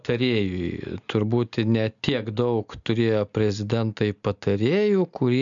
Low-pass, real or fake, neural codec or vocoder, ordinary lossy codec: 7.2 kHz; real; none; MP3, 96 kbps